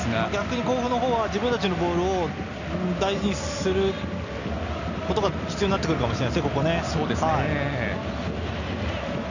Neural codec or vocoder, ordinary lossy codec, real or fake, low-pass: none; none; real; 7.2 kHz